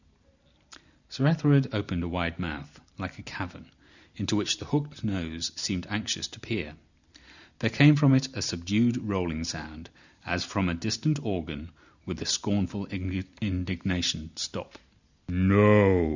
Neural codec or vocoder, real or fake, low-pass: none; real; 7.2 kHz